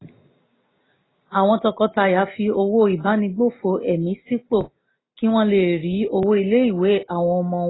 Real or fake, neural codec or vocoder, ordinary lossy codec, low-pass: real; none; AAC, 16 kbps; 7.2 kHz